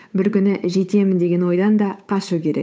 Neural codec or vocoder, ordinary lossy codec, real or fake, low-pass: codec, 16 kHz, 8 kbps, FunCodec, trained on Chinese and English, 25 frames a second; none; fake; none